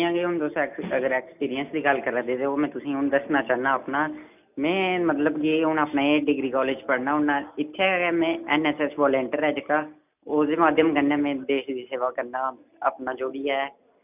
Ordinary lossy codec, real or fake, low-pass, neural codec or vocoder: none; real; 3.6 kHz; none